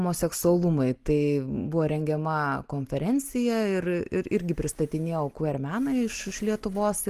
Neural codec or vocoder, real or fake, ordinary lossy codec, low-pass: none; real; Opus, 24 kbps; 14.4 kHz